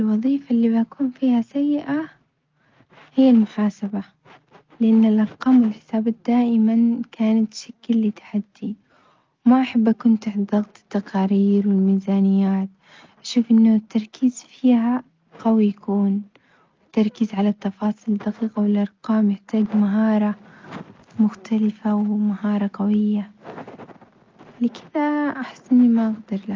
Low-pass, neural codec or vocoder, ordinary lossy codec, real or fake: 7.2 kHz; none; Opus, 32 kbps; real